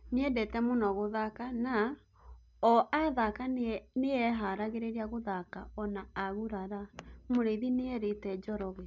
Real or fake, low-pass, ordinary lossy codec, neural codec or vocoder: real; 7.2 kHz; MP3, 64 kbps; none